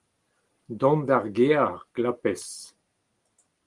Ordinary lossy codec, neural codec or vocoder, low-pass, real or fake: Opus, 24 kbps; none; 10.8 kHz; real